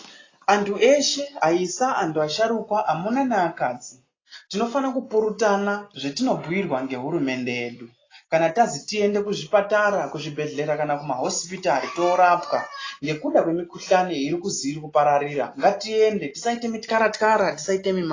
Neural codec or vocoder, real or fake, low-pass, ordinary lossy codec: none; real; 7.2 kHz; AAC, 32 kbps